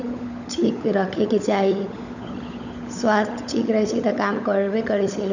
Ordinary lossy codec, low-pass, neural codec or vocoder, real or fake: none; 7.2 kHz; codec, 16 kHz, 16 kbps, FunCodec, trained on LibriTTS, 50 frames a second; fake